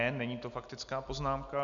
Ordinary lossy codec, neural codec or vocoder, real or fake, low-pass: MP3, 64 kbps; none; real; 7.2 kHz